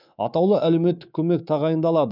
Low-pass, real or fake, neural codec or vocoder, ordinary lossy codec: 5.4 kHz; fake; codec, 24 kHz, 3.1 kbps, DualCodec; none